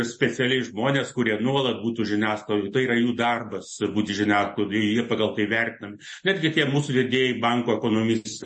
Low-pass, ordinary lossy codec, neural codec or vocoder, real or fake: 9.9 kHz; MP3, 32 kbps; none; real